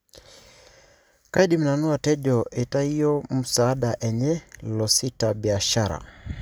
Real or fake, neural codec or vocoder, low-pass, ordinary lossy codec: real; none; none; none